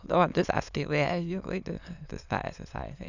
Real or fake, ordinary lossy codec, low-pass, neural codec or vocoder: fake; none; 7.2 kHz; autoencoder, 22.05 kHz, a latent of 192 numbers a frame, VITS, trained on many speakers